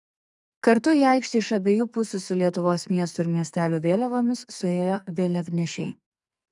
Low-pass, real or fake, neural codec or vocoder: 10.8 kHz; fake; codec, 44.1 kHz, 2.6 kbps, SNAC